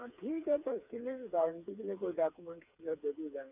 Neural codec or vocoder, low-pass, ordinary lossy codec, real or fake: codec, 16 kHz, 4 kbps, FreqCodec, smaller model; 3.6 kHz; none; fake